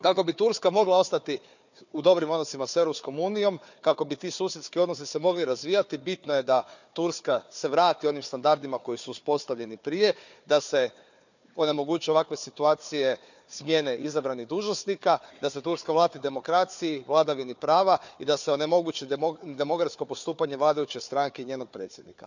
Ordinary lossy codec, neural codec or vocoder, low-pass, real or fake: none; codec, 16 kHz, 4 kbps, FunCodec, trained on Chinese and English, 50 frames a second; 7.2 kHz; fake